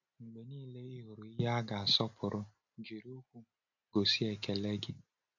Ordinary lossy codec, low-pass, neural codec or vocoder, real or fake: none; 7.2 kHz; none; real